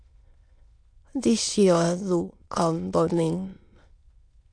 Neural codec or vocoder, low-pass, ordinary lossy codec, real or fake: autoencoder, 22.05 kHz, a latent of 192 numbers a frame, VITS, trained on many speakers; 9.9 kHz; MP3, 64 kbps; fake